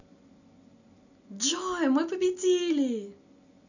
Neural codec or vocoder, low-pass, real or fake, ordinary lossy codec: none; 7.2 kHz; real; none